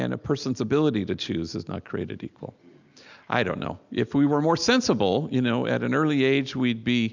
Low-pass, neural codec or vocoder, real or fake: 7.2 kHz; none; real